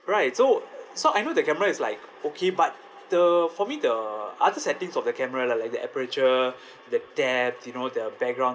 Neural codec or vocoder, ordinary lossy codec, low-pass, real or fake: none; none; none; real